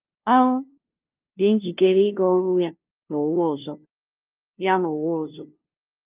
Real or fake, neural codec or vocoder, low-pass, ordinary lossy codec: fake; codec, 16 kHz, 0.5 kbps, FunCodec, trained on LibriTTS, 25 frames a second; 3.6 kHz; Opus, 24 kbps